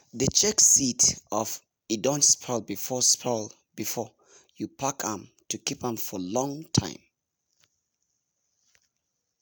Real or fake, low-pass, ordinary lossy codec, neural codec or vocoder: real; none; none; none